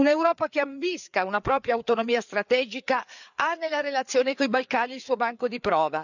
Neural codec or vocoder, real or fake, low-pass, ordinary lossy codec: codec, 16 kHz, 4 kbps, FreqCodec, larger model; fake; 7.2 kHz; none